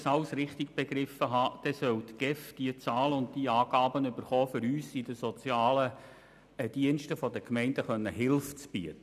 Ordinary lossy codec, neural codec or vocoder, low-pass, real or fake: none; none; 14.4 kHz; real